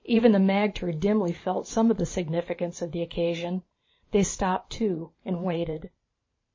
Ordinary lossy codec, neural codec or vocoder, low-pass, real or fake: MP3, 32 kbps; vocoder, 44.1 kHz, 128 mel bands every 512 samples, BigVGAN v2; 7.2 kHz; fake